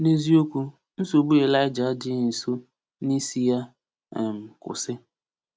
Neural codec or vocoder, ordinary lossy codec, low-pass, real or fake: none; none; none; real